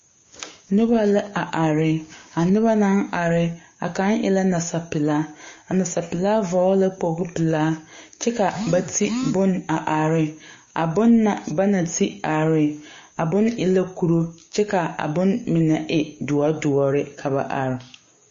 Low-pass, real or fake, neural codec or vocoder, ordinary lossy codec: 7.2 kHz; fake; codec, 16 kHz, 6 kbps, DAC; MP3, 32 kbps